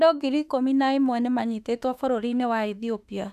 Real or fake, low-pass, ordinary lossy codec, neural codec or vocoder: fake; 14.4 kHz; none; autoencoder, 48 kHz, 32 numbers a frame, DAC-VAE, trained on Japanese speech